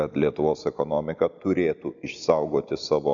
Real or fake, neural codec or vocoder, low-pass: real; none; 7.2 kHz